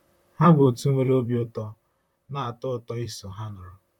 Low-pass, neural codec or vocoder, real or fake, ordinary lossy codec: 19.8 kHz; vocoder, 44.1 kHz, 128 mel bands, Pupu-Vocoder; fake; MP3, 96 kbps